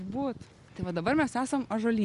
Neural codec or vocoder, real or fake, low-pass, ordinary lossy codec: none; real; 10.8 kHz; Opus, 64 kbps